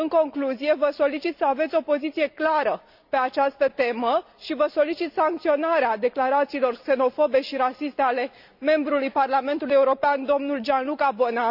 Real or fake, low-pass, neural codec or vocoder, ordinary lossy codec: real; 5.4 kHz; none; none